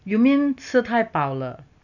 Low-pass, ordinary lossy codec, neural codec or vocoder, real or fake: 7.2 kHz; none; none; real